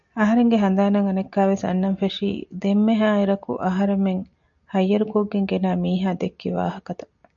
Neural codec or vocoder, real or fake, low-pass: none; real; 7.2 kHz